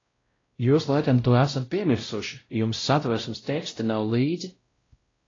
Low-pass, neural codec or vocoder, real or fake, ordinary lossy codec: 7.2 kHz; codec, 16 kHz, 0.5 kbps, X-Codec, WavLM features, trained on Multilingual LibriSpeech; fake; AAC, 32 kbps